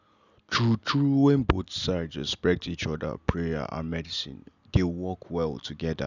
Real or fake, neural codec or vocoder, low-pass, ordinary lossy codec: real; none; 7.2 kHz; none